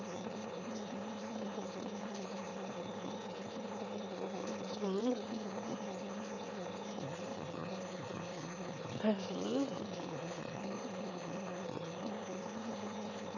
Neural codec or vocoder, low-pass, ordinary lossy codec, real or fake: autoencoder, 22.05 kHz, a latent of 192 numbers a frame, VITS, trained on one speaker; 7.2 kHz; none; fake